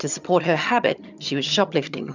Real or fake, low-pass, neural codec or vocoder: fake; 7.2 kHz; vocoder, 22.05 kHz, 80 mel bands, HiFi-GAN